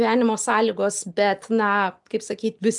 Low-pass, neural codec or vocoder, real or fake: 10.8 kHz; none; real